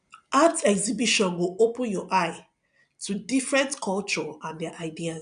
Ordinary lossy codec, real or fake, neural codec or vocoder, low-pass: none; real; none; 9.9 kHz